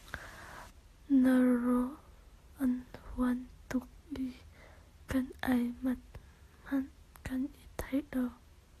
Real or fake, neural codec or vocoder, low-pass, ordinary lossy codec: real; none; 14.4 kHz; AAC, 48 kbps